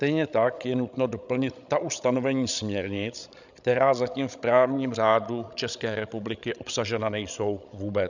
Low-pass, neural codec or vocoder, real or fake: 7.2 kHz; codec, 16 kHz, 16 kbps, FreqCodec, larger model; fake